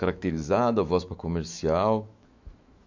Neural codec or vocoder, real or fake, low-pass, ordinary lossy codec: autoencoder, 48 kHz, 128 numbers a frame, DAC-VAE, trained on Japanese speech; fake; 7.2 kHz; MP3, 48 kbps